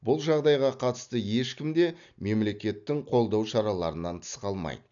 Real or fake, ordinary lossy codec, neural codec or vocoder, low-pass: real; none; none; 7.2 kHz